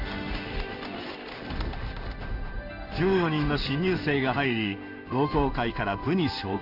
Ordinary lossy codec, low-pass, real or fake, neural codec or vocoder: none; 5.4 kHz; fake; codec, 16 kHz in and 24 kHz out, 1 kbps, XY-Tokenizer